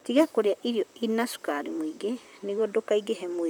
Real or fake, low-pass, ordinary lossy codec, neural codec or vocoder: fake; none; none; vocoder, 44.1 kHz, 128 mel bands, Pupu-Vocoder